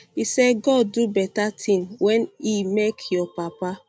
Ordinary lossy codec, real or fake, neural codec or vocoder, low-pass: none; real; none; none